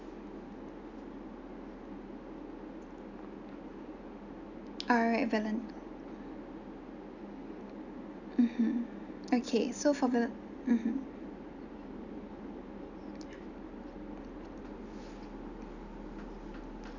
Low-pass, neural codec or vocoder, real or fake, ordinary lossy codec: 7.2 kHz; none; real; none